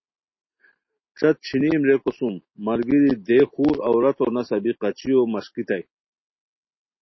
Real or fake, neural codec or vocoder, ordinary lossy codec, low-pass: real; none; MP3, 24 kbps; 7.2 kHz